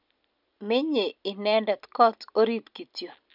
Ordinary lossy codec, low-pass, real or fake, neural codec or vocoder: none; 5.4 kHz; real; none